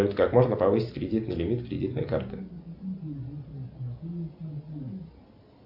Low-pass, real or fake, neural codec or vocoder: 5.4 kHz; real; none